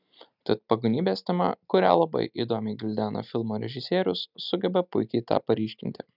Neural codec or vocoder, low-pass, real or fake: none; 5.4 kHz; real